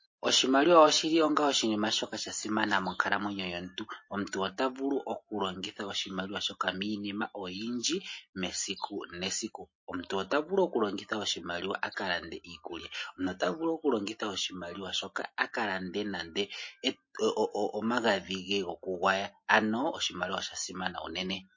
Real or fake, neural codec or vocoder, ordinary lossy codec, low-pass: real; none; MP3, 32 kbps; 7.2 kHz